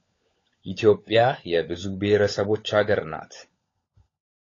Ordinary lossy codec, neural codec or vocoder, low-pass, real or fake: AAC, 32 kbps; codec, 16 kHz, 16 kbps, FunCodec, trained on LibriTTS, 50 frames a second; 7.2 kHz; fake